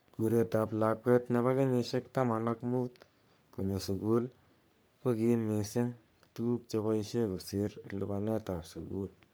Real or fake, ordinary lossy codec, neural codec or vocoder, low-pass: fake; none; codec, 44.1 kHz, 3.4 kbps, Pupu-Codec; none